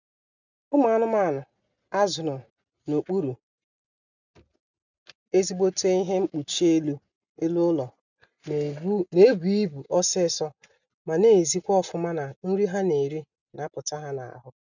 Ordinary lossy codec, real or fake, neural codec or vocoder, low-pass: none; real; none; 7.2 kHz